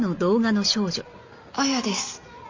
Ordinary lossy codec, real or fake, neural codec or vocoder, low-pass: MP3, 48 kbps; real; none; 7.2 kHz